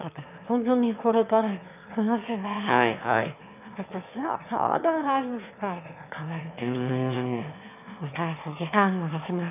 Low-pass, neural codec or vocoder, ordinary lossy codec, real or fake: 3.6 kHz; autoencoder, 22.05 kHz, a latent of 192 numbers a frame, VITS, trained on one speaker; none; fake